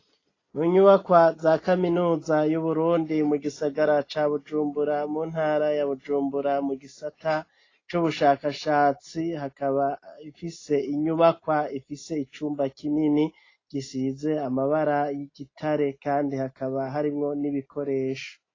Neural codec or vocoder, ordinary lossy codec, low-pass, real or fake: none; AAC, 32 kbps; 7.2 kHz; real